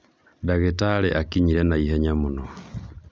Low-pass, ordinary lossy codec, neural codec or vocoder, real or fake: none; none; none; real